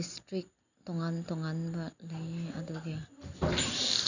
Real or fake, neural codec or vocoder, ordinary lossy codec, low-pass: real; none; none; 7.2 kHz